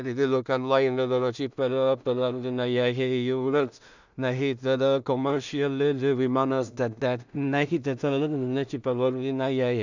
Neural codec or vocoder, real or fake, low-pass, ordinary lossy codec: codec, 16 kHz in and 24 kHz out, 0.4 kbps, LongCat-Audio-Codec, two codebook decoder; fake; 7.2 kHz; none